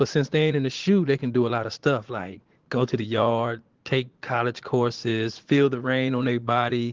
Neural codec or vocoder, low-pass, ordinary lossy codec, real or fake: vocoder, 44.1 kHz, 80 mel bands, Vocos; 7.2 kHz; Opus, 16 kbps; fake